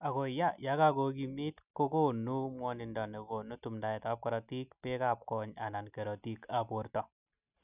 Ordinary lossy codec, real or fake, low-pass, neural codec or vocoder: none; real; 3.6 kHz; none